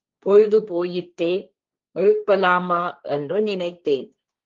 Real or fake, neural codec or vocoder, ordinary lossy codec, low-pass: fake; codec, 16 kHz, 1.1 kbps, Voila-Tokenizer; Opus, 32 kbps; 7.2 kHz